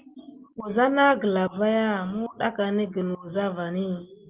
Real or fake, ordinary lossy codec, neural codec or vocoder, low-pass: real; Opus, 32 kbps; none; 3.6 kHz